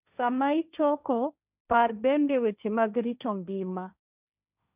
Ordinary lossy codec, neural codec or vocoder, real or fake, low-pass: none; codec, 16 kHz, 1.1 kbps, Voila-Tokenizer; fake; 3.6 kHz